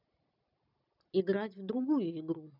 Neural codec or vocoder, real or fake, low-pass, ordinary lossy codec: none; real; 5.4 kHz; none